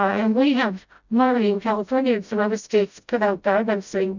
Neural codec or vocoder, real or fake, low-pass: codec, 16 kHz, 0.5 kbps, FreqCodec, smaller model; fake; 7.2 kHz